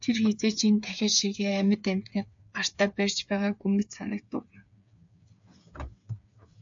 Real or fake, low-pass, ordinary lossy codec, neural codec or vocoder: fake; 7.2 kHz; AAC, 64 kbps; codec, 16 kHz, 8 kbps, FreqCodec, smaller model